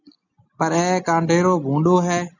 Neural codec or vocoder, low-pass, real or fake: none; 7.2 kHz; real